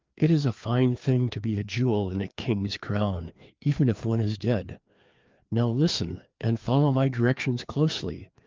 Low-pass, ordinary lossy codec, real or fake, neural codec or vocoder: 7.2 kHz; Opus, 24 kbps; fake; codec, 16 kHz, 2 kbps, FreqCodec, larger model